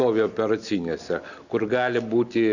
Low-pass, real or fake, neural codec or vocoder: 7.2 kHz; real; none